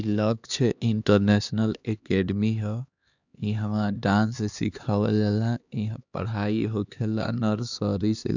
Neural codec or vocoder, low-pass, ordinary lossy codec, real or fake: codec, 16 kHz, 2 kbps, X-Codec, HuBERT features, trained on LibriSpeech; 7.2 kHz; none; fake